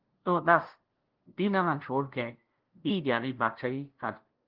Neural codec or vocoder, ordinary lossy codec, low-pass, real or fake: codec, 16 kHz, 0.5 kbps, FunCodec, trained on LibriTTS, 25 frames a second; Opus, 16 kbps; 5.4 kHz; fake